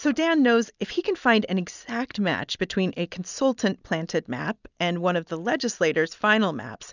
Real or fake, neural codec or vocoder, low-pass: real; none; 7.2 kHz